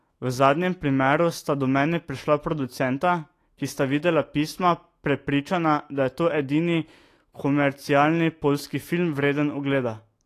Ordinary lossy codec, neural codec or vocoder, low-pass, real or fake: AAC, 48 kbps; autoencoder, 48 kHz, 128 numbers a frame, DAC-VAE, trained on Japanese speech; 14.4 kHz; fake